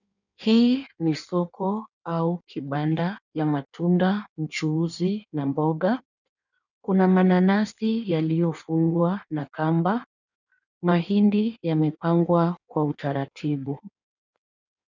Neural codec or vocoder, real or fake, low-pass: codec, 16 kHz in and 24 kHz out, 1.1 kbps, FireRedTTS-2 codec; fake; 7.2 kHz